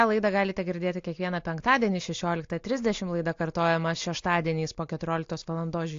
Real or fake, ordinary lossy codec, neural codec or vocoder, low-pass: real; AAC, 48 kbps; none; 7.2 kHz